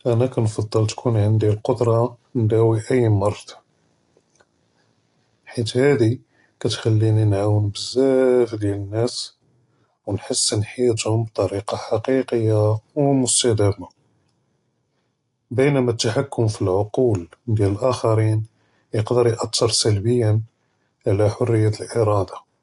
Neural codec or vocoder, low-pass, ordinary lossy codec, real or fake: none; 10.8 kHz; AAC, 48 kbps; real